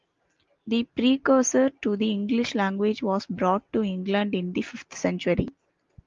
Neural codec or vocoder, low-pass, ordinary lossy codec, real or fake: none; 7.2 kHz; Opus, 32 kbps; real